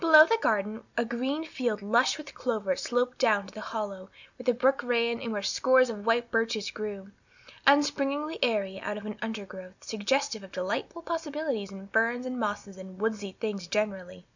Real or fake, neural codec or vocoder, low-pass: real; none; 7.2 kHz